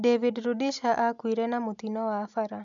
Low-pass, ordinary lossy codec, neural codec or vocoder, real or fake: 7.2 kHz; none; none; real